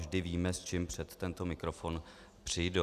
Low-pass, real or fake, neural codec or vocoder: 14.4 kHz; real; none